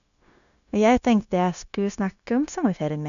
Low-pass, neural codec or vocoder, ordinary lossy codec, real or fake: 7.2 kHz; codec, 16 kHz, 0.9 kbps, LongCat-Audio-Codec; none; fake